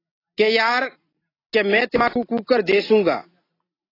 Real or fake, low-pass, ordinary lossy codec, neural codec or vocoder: real; 5.4 kHz; AAC, 24 kbps; none